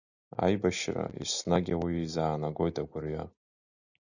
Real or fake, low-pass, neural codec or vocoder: real; 7.2 kHz; none